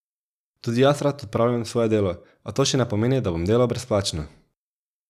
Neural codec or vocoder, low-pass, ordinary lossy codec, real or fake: none; 14.4 kHz; none; real